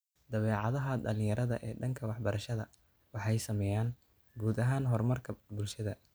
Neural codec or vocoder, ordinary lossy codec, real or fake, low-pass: none; none; real; none